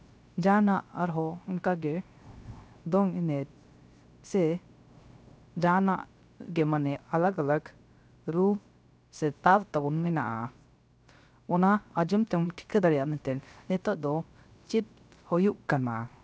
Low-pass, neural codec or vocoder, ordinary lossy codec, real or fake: none; codec, 16 kHz, 0.3 kbps, FocalCodec; none; fake